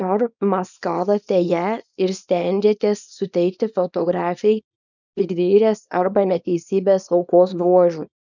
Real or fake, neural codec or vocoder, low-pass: fake; codec, 24 kHz, 0.9 kbps, WavTokenizer, small release; 7.2 kHz